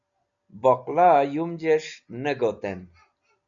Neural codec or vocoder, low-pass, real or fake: none; 7.2 kHz; real